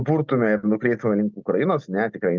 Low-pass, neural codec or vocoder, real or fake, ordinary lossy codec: 7.2 kHz; none; real; Opus, 32 kbps